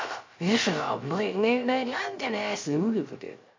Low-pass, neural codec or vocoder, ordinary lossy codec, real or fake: 7.2 kHz; codec, 16 kHz, 0.3 kbps, FocalCodec; MP3, 48 kbps; fake